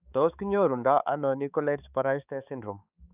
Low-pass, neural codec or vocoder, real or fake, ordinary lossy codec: 3.6 kHz; codec, 16 kHz, 4 kbps, X-Codec, HuBERT features, trained on balanced general audio; fake; none